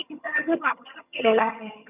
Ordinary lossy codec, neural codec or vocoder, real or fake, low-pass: none; vocoder, 22.05 kHz, 80 mel bands, HiFi-GAN; fake; 3.6 kHz